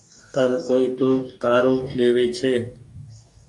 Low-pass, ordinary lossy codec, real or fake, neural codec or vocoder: 10.8 kHz; AAC, 64 kbps; fake; codec, 44.1 kHz, 2.6 kbps, DAC